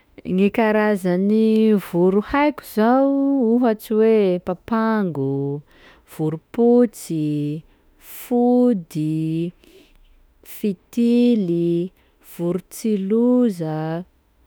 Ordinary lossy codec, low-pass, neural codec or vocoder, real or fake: none; none; autoencoder, 48 kHz, 32 numbers a frame, DAC-VAE, trained on Japanese speech; fake